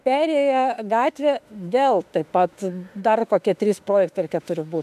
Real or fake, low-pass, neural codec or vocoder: fake; 14.4 kHz; autoencoder, 48 kHz, 32 numbers a frame, DAC-VAE, trained on Japanese speech